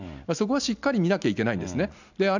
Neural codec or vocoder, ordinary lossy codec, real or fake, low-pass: none; none; real; 7.2 kHz